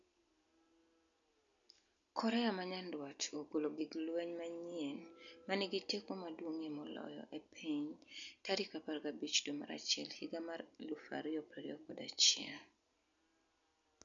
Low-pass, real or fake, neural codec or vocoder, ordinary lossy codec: 7.2 kHz; real; none; none